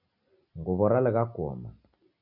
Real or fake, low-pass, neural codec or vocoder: real; 5.4 kHz; none